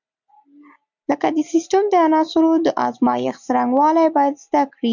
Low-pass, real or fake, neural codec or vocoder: 7.2 kHz; real; none